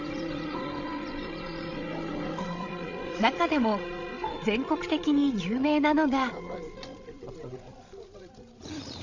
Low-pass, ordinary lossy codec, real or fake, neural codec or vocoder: 7.2 kHz; none; fake; codec, 16 kHz, 16 kbps, FreqCodec, larger model